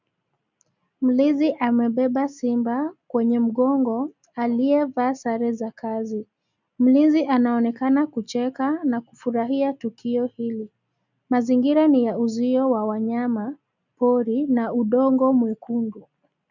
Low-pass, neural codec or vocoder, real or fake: 7.2 kHz; none; real